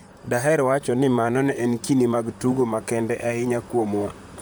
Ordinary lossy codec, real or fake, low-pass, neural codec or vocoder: none; fake; none; vocoder, 44.1 kHz, 128 mel bands, Pupu-Vocoder